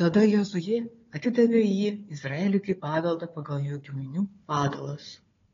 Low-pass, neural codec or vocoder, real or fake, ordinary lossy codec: 7.2 kHz; codec, 16 kHz, 4 kbps, FunCodec, trained on Chinese and English, 50 frames a second; fake; AAC, 32 kbps